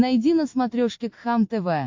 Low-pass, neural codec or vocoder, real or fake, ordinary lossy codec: 7.2 kHz; none; real; MP3, 48 kbps